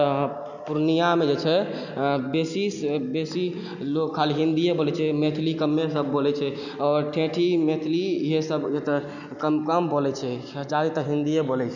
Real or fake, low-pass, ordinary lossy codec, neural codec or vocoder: real; 7.2 kHz; none; none